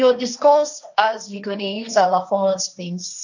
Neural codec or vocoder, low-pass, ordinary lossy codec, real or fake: codec, 16 kHz, 1.1 kbps, Voila-Tokenizer; 7.2 kHz; none; fake